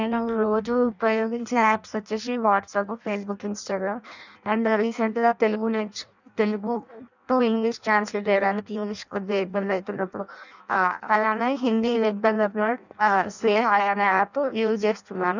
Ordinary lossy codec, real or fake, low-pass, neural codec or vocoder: none; fake; 7.2 kHz; codec, 16 kHz in and 24 kHz out, 0.6 kbps, FireRedTTS-2 codec